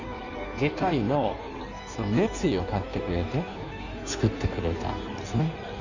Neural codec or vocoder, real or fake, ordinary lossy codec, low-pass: codec, 16 kHz in and 24 kHz out, 1.1 kbps, FireRedTTS-2 codec; fake; none; 7.2 kHz